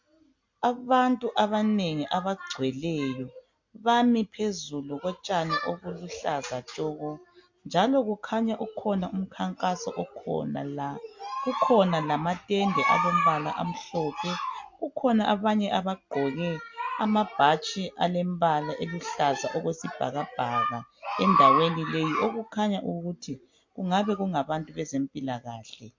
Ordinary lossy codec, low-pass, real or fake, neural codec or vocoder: MP3, 48 kbps; 7.2 kHz; real; none